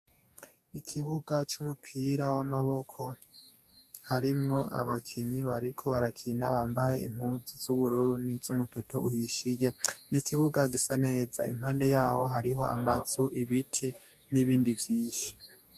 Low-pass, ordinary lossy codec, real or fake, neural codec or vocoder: 14.4 kHz; MP3, 96 kbps; fake; codec, 44.1 kHz, 2.6 kbps, DAC